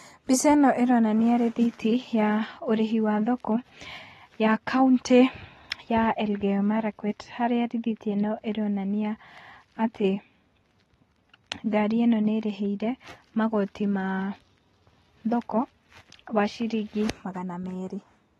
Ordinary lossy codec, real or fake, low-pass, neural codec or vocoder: AAC, 32 kbps; real; 19.8 kHz; none